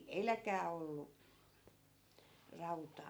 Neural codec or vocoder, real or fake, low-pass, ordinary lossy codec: none; real; none; none